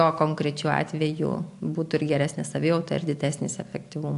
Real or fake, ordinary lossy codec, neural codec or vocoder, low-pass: real; AAC, 64 kbps; none; 10.8 kHz